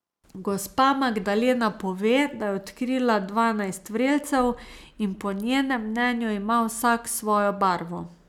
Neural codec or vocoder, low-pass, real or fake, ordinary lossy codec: none; 19.8 kHz; real; none